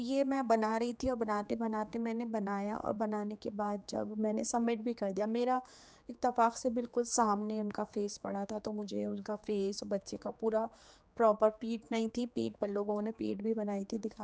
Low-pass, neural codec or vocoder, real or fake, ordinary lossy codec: none; codec, 16 kHz, 4 kbps, X-Codec, HuBERT features, trained on general audio; fake; none